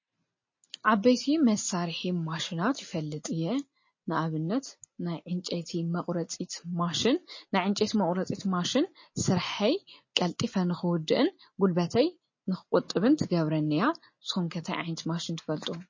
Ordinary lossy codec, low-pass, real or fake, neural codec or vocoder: MP3, 32 kbps; 7.2 kHz; real; none